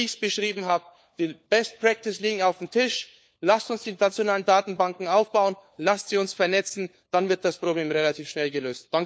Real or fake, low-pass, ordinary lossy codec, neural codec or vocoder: fake; none; none; codec, 16 kHz, 4 kbps, FunCodec, trained on LibriTTS, 50 frames a second